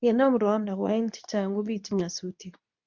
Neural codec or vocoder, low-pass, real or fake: codec, 24 kHz, 0.9 kbps, WavTokenizer, medium speech release version 2; 7.2 kHz; fake